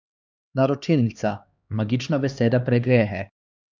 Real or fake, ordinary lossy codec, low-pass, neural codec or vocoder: fake; none; none; codec, 16 kHz, 2 kbps, X-Codec, HuBERT features, trained on LibriSpeech